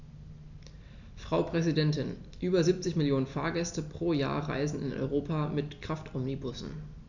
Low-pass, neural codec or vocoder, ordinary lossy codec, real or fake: 7.2 kHz; none; none; real